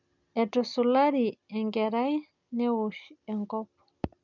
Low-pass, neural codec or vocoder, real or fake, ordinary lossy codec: 7.2 kHz; none; real; none